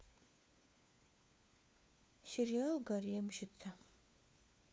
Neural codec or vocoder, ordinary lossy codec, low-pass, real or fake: codec, 16 kHz, 4 kbps, FunCodec, trained on LibriTTS, 50 frames a second; none; none; fake